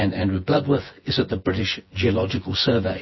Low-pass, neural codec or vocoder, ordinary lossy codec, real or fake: 7.2 kHz; vocoder, 24 kHz, 100 mel bands, Vocos; MP3, 24 kbps; fake